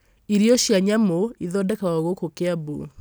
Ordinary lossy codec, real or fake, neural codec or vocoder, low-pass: none; real; none; none